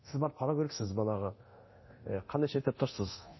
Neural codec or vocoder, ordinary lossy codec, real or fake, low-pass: codec, 24 kHz, 0.9 kbps, DualCodec; MP3, 24 kbps; fake; 7.2 kHz